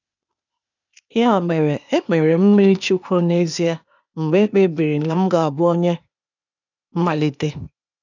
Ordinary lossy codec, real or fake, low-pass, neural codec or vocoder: none; fake; 7.2 kHz; codec, 16 kHz, 0.8 kbps, ZipCodec